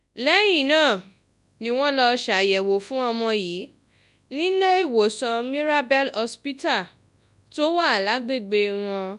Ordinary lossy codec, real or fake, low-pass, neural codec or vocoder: none; fake; 10.8 kHz; codec, 24 kHz, 0.9 kbps, WavTokenizer, large speech release